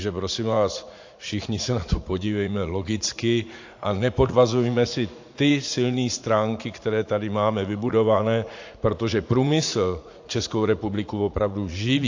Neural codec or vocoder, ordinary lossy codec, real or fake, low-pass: vocoder, 44.1 kHz, 80 mel bands, Vocos; AAC, 48 kbps; fake; 7.2 kHz